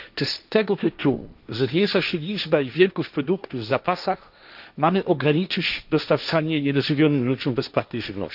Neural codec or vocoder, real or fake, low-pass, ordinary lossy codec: codec, 16 kHz, 1.1 kbps, Voila-Tokenizer; fake; 5.4 kHz; none